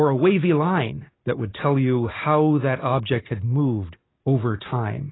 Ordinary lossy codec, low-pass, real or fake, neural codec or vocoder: AAC, 16 kbps; 7.2 kHz; real; none